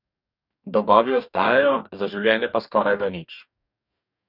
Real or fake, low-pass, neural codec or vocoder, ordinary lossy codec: fake; 5.4 kHz; codec, 44.1 kHz, 2.6 kbps, DAC; none